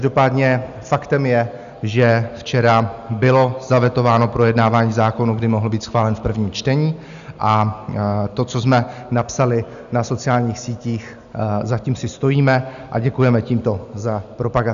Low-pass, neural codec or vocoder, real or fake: 7.2 kHz; none; real